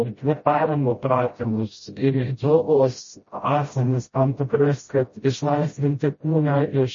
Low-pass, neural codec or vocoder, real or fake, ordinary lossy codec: 7.2 kHz; codec, 16 kHz, 0.5 kbps, FreqCodec, smaller model; fake; MP3, 32 kbps